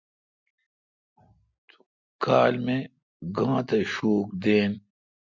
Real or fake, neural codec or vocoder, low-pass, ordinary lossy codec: real; none; 7.2 kHz; MP3, 64 kbps